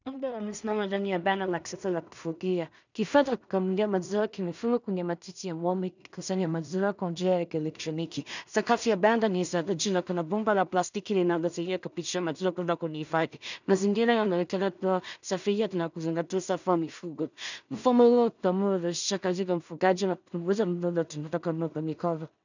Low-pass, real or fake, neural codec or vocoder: 7.2 kHz; fake; codec, 16 kHz in and 24 kHz out, 0.4 kbps, LongCat-Audio-Codec, two codebook decoder